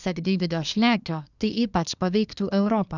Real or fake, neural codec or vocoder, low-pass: fake; codec, 24 kHz, 1 kbps, SNAC; 7.2 kHz